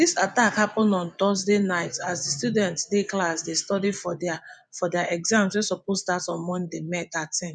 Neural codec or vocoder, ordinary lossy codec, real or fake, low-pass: vocoder, 24 kHz, 100 mel bands, Vocos; none; fake; 9.9 kHz